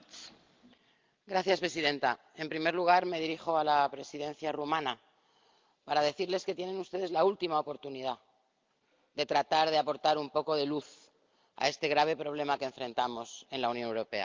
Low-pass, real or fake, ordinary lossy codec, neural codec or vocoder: 7.2 kHz; real; Opus, 32 kbps; none